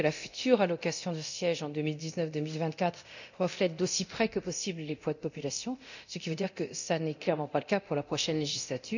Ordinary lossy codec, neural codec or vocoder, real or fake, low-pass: none; codec, 24 kHz, 0.9 kbps, DualCodec; fake; 7.2 kHz